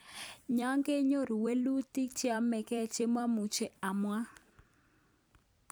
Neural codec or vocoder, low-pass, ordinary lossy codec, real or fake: vocoder, 44.1 kHz, 128 mel bands every 256 samples, BigVGAN v2; none; none; fake